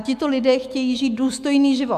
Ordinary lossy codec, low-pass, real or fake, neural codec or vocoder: AAC, 96 kbps; 14.4 kHz; fake; autoencoder, 48 kHz, 128 numbers a frame, DAC-VAE, trained on Japanese speech